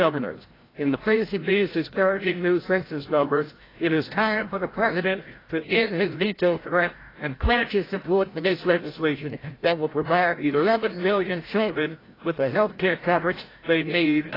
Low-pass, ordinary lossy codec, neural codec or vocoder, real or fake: 5.4 kHz; AAC, 24 kbps; codec, 16 kHz, 0.5 kbps, FreqCodec, larger model; fake